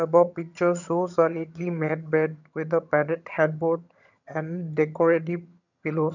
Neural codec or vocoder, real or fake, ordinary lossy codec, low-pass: vocoder, 22.05 kHz, 80 mel bands, HiFi-GAN; fake; none; 7.2 kHz